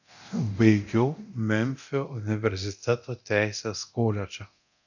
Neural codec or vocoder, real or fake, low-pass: codec, 24 kHz, 0.9 kbps, DualCodec; fake; 7.2 kHz